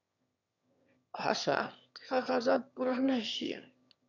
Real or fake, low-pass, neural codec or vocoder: fake; 7.2 kHz; autoencoder, 22.05 kHz, a latent of 192 numbers a frame, VITS, trained on one speaker